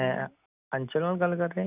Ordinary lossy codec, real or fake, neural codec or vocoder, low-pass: none; real; none; 3.6 kHz